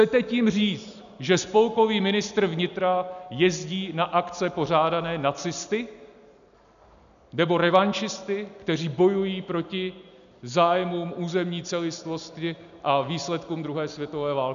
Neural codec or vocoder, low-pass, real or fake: none; 7.2 kHz; real